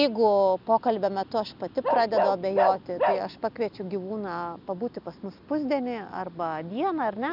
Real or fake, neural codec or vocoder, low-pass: real; none; 5.4 kHz